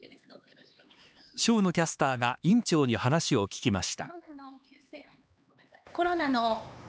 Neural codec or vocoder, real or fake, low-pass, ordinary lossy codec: codec, 16 kHz, 2 kbps, X-Codec, HuBERT features, trained on LibriSpeech; fake; none; none